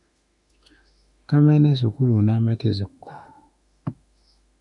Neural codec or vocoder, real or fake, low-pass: autoencoder, 48 kHz, 32 numbers a frame, DAC-VAE, trained on Japanese speech; fake; 10.8 kHz